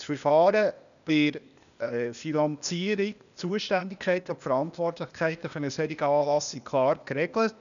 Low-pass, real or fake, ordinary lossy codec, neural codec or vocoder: 7.2 kHz; fake; none; codec, 16 kHz, 0.8 kbps, ZipCodec